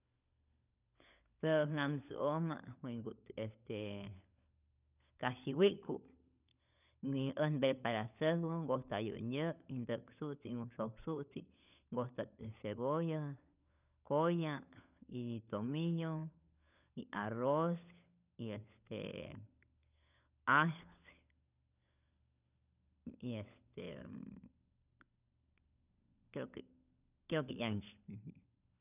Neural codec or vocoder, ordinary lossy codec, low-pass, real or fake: codec, 16 kHz, 16 kbps, FunCodec, trained on LibriTTS, 50 frames a second; none; 3.6 kHz; fake